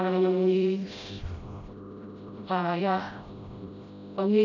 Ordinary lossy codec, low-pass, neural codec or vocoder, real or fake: none; 7.2 kHz; codec, 16 kHz, 0.5 kbps, FreqCodec, smaller model; fake